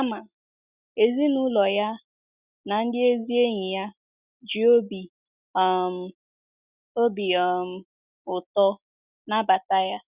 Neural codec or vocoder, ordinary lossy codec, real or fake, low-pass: none; Opus, 64 kbps; real; 3.6 kHz